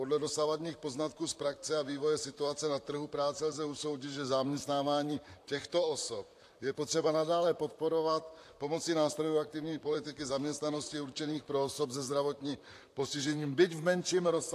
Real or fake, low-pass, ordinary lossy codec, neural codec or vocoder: fake; 14.4 kHz; AAC, 64 kbps; vocoder, 44.1 kHz, 128 mel bands, Pupu-Vocoder